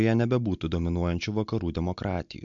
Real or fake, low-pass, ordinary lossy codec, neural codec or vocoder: real; 7.2 kHz; MP3, 64 kbps; none